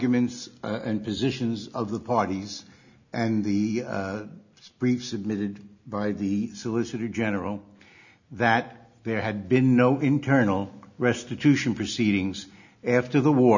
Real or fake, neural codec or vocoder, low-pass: real; none; 7.2 kHz